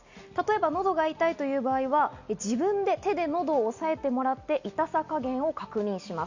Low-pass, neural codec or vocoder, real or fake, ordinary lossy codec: 7.2 kHz; none; real; Opus, 64 kbps